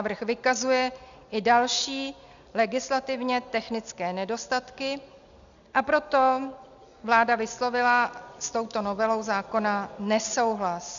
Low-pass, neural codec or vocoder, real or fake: 7.2 kHz; none; real